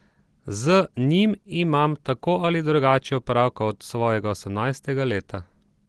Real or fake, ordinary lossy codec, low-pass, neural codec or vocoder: real; Opus, 16 kbps; 10.8 kHz; none